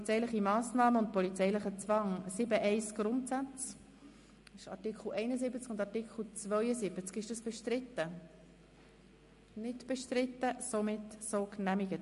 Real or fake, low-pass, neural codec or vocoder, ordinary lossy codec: real; 14.4 kHz; none; MP3, 48 kbps